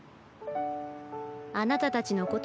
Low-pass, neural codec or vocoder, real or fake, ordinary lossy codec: none; none; real; none